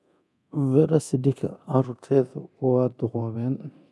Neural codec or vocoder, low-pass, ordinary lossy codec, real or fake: codec, 24 kHz, 0.9 kbps, DualCodec; none; none; fake